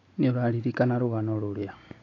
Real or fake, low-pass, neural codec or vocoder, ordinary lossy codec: real; 7.2 kHz; none; AAC, 32 kbps